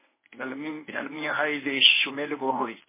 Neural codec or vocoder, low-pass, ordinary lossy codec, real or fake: codec, 24 kHz, 0.9 kbps, WavTokenizer, medium speech release version 1; 3.6 kHz; MP3, 16 kbps; fake